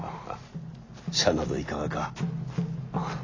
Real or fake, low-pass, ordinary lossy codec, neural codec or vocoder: real; 7.2 kHz; AAC, 32 kbps; none